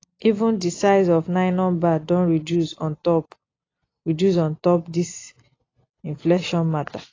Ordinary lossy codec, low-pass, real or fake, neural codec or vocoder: AAC, 32 kbps; 7.2 kHz; real; none